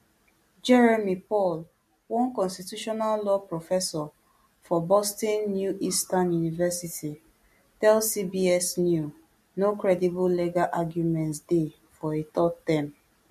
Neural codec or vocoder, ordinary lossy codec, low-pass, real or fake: none; MP3, 64 kbps; 14.4 kHz; real